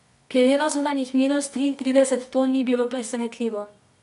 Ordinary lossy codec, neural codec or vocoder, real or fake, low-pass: none; codec, 24 kHz, 0.9 kbps, WavTokenizer, medium music audio release; fake; 10.8 kHz